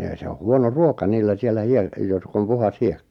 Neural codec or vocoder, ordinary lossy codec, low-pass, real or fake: none; none; 19.8 kHz; real